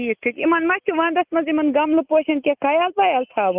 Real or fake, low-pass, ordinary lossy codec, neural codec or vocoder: real; 3.6 kHz; Opus, 24 kbps; none